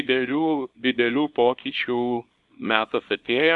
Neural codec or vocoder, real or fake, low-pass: codec, 24 kHz, 0.9 kbps, WavTokenizer, small release; fake; 10.8 kHz